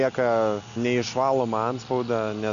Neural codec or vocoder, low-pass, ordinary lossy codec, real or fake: none; 7.2 kHz; MP3, 96 kbps; real